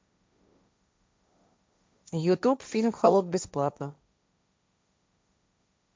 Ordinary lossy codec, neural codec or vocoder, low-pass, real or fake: none; codec, 16 kHz, 1.1 kbps, Voila-Tokenizer; none; fake